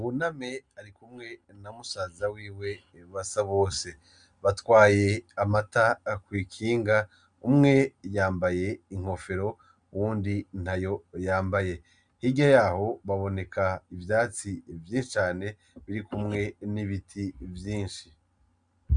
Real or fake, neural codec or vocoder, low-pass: real; none; 9.9 kHz